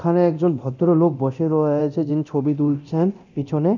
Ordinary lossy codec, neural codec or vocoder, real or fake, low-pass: none; codec, 24 kHz, 0.9 kbps, DualCodec; fake; 7.2 kHz